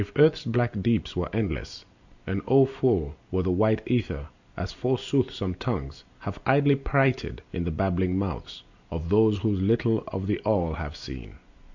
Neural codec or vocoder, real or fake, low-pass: none; real; 7.2 kHz